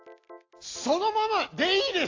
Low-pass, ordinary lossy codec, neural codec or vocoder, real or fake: 7.2 kHz; AAC, 32 kbps; none; real